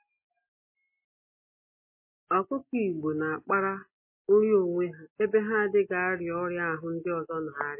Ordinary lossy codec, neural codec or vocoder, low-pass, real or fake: MP3, 24 kbps; none; 3.6 kHz; real